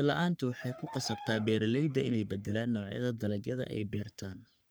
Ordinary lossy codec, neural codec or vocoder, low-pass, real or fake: none; codec, 44.1 kHz, 3.4 kbps, Pupu-Codec; none; fake